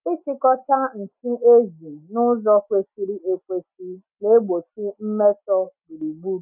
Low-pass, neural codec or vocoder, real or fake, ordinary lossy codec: 3.6 kHz; none; real; none